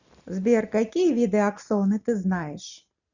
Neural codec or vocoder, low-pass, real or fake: none; 7.2 kHz; real